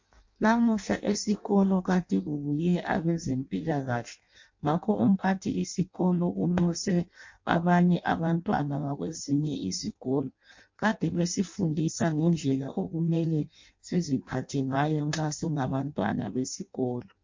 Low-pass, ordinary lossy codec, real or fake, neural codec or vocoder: 7.2 kHz; MP3, 48 kbps; fake; codec, 16 kHz in and 24 kHz out, 0.6 kbps, FireRedTTS-2 codec